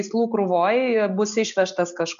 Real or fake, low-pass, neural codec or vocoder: real; 7.2 kHz; none